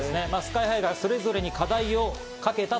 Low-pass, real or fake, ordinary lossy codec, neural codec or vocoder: none; real; none; none